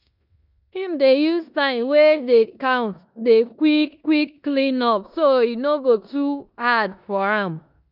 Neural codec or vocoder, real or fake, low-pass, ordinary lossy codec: codec, 16 kHz in and 24 kHz out, 0.9 kbps, LongCat-Audio-Codec, four codebook decoder; fake; 5.4 kHz; none